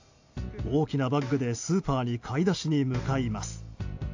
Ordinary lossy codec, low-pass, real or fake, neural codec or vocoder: AAC, 48 kbps; 7.2 kHz; real; none